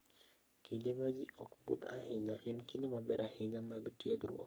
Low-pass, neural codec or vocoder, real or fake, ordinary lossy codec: none; codec, 44.1 kHz, 3.4 kbps, Pupu-Codec; fake; none